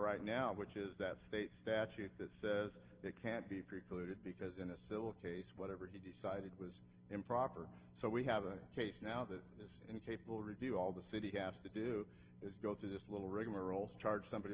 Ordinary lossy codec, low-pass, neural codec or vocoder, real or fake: Opus, 16 kbps; 3.6 kHz; none; real